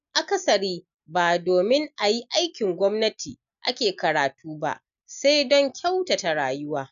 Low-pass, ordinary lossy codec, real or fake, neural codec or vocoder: 7.2 kHz; none; real; none